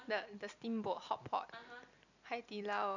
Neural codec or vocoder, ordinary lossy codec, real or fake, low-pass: none; none; real; 7.2 kHz